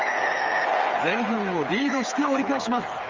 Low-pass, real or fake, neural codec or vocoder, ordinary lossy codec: 7.2 kHz; fake; codec, 16 kHz, 8 kbps, FreqCodec, smaller model; Opus, 32 kbps